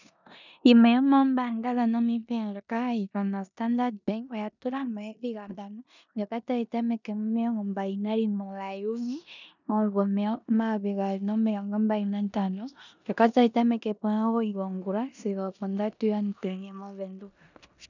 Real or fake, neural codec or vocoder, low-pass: fake; codec, 16 kHz in and 24 kHz out, 0.9 kbps, LongCat-Audio-Codec, four codebook decoder; 7.2 kHz